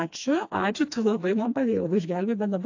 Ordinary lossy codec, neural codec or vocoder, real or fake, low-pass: AAC, 48 kbps; codec, 16 kHz, 2 kbps, FreqCodec, smaller model; fake; 7.2 kHz